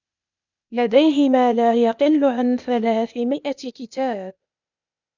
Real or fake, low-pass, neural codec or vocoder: fake; 7.2 kHz; codec, 16 kHz, 0.8 kbps, ZipCodec